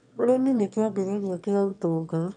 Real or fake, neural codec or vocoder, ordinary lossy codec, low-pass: fake; autoencoder, 22.05 kHz, a latent of 192 numbers a frame, VITS, trained on one speaker; none; 9.9 kHz